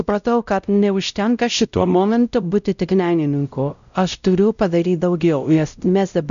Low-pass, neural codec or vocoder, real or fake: 7.2 kHz; codec, 16 kHz, 0.5 kbps, X-Codec, WavLM features, trained on Multilingual LibriSpeech; fake